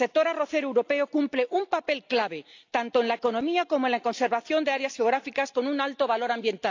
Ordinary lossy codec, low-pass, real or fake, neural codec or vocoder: AAC, 48 kbps; 7.2 kHz; real; none